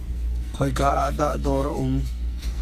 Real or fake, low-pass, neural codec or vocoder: fake; 14.4 kHz; codec, 44.1 kHz, 2.6 kbps, SNAC